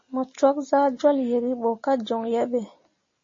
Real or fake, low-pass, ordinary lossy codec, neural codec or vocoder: real; 7.2 kHz; MP3, 32 kbps; none